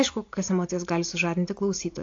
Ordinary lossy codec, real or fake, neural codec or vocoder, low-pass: AAC, 48 kbps; real; none; 7.2 kHz